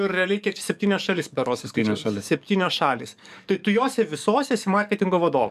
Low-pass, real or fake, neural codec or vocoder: 14.4 kHz; fake; codec, 44.1 kHz, 7.8 kbps, DAC